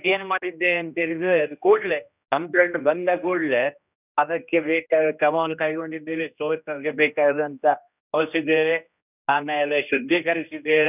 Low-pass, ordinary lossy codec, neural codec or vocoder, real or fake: 3.6 kHz; none; codec, 16 kHz, 1 kbps, X-Codec, HuBERT features, trained on general audio; fake